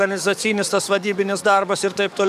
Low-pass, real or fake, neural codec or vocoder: 14.4 kHz; fake; codec, 44.1 kHz, 7.8 kbps, DAC